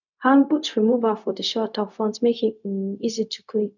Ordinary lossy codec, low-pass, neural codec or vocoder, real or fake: none; 7.2 kHz; codec, 16 kHz, 0.4 kbps, LongCat-Audio-Codec; fake